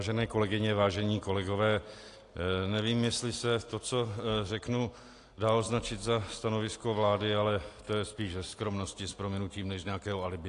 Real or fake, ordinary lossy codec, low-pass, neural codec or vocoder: real; AAC, 48 kbps; 10.8 kHz; none